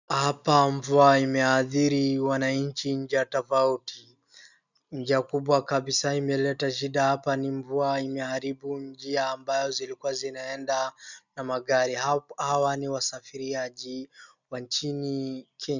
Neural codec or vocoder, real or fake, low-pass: none; real; 7.2 kHz